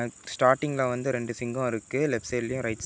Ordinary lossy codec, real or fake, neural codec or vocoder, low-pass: none; real; none; none